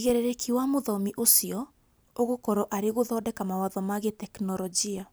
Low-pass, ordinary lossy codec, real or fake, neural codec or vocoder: none; none; real; none